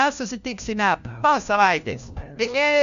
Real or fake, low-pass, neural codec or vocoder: fake; 7.2 kHz; codec, 16 kHz, 1 kbps, FunCodec, trained on LibriTTS, 50 frames a second